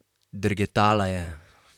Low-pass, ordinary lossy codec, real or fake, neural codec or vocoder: 19.8 kHz; none; fake; vocoder, 44.1 kHz, 128 mel bands, Pupu-Vocoder